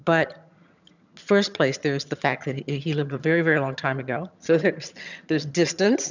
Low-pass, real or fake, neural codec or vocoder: 7.2 kHz; fake; vocoder, 22.05 kHz, 80 mel bands, HiFi-GAN